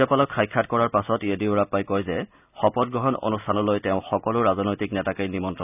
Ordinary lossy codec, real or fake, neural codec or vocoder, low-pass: none; real; none; 3.6 kHz